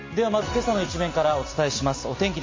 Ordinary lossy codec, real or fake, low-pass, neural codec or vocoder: MP3, 32 kbps; real; 7.2 kHz; none